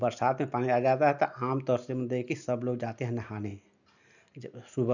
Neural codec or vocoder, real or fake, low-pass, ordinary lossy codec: none; real; 7.2 kHz; none